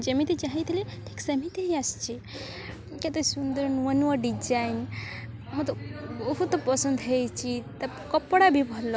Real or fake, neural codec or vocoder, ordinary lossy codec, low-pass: real; none; none; none